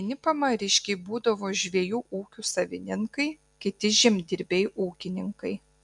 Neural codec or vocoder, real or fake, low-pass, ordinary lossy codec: none; real; 10.8 kHz; MP3, 96 kbps